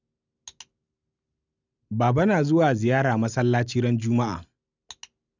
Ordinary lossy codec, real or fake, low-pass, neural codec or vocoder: none; real; 7.2 kHz; none